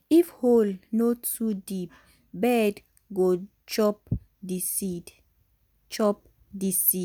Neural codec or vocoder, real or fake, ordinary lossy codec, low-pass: none; real; none; none